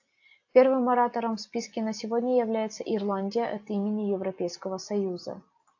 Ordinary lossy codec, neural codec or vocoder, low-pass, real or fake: AAC, 48 kbps; none; 7.2 kHz; real